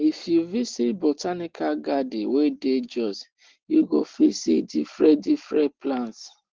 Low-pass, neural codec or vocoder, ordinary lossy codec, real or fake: 7.2 kHz; none; Opus, 16 kbps; real